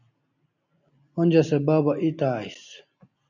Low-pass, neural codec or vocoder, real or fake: 7.2 kHz; none; real